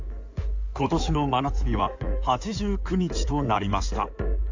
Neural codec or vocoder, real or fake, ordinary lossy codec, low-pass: codec, 16 kHz in and 24 kHz out, 2.2 kbps, FireRedTTS-2 codec; fake; AAC, 48 kbps; 7.2 kHz